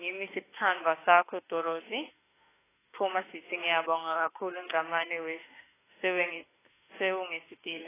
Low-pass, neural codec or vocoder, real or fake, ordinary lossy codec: 3.6 kHz; autoencoder, 48 kHz, 32 numbers a frame, DAC-VAE, trained on Japanese speech; fake; AAC, 16 kbps